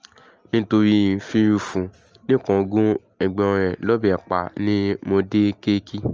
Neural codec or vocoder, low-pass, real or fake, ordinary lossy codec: none; 7.2 kHz; real; Opus, 24 kbps